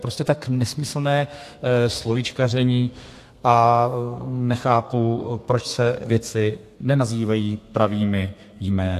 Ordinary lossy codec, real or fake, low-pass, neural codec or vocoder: AAC, 64 kbps; fake; 14.4 kHz; codec, 32 kHz, 1.9 kbps, SNAC